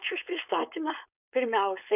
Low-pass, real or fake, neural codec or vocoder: 3.6 kHz; fake; codec, 16 kHz, 4.8 kbps, FACodec